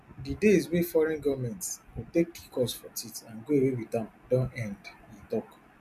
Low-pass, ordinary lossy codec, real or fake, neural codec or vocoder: 14.4 kHz; none; real; none